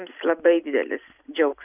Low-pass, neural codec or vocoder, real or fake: 3.6 kHz; none; real